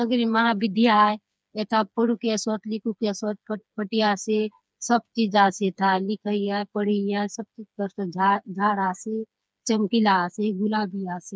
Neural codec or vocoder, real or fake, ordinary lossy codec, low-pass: codec, 16 kHz, 4 kbps, FreqCodec, smaller model; fake; none; none